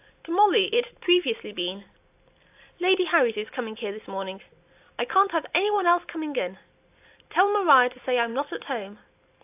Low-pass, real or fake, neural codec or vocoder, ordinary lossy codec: 3.6 kHz; real; none; AAC, 32 kbps